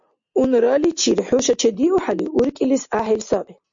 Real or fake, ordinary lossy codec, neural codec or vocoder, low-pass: real; MP3, 48 kbps; none; 7.2 kHz